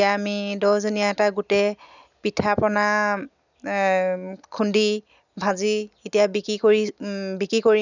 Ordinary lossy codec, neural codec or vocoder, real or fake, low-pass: none; none; real; 7.2 kHz